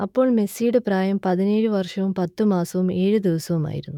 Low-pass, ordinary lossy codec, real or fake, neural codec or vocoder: 19.8 kHz; none; fake; autoencoder, 48 kHz, 128 numbers a frame, DAC-VAE, trained on Japanese speech